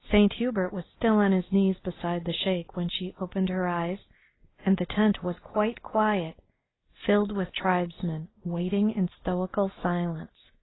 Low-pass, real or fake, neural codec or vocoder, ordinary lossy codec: 7.2 kHz; real; none; AAC, 16 kbps